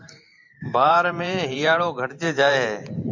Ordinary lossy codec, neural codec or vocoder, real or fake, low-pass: MP3, 48 kbps; vocoder, 44.1 kHz, 128 mel bands every 512 samples, BigVGAN v2; fake; 7.2 kHz